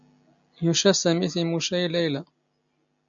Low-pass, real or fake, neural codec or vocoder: 7.2 kHz; real; none